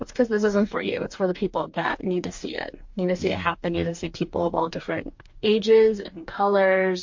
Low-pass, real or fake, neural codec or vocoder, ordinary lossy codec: 7.2 kHz; fake; codec, 44.1 kHz, 2.6 kbps, DAC; MP3, 48 kbps